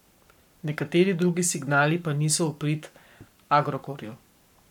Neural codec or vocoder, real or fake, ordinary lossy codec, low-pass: codec, 44.1 kHz, 7.8 kbps, Pupu-Codec; fake; none; 19.8 kHz